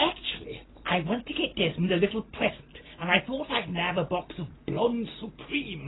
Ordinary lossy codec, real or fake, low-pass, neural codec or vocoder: AAC, 16 kbps; real; 7.2 kHz; none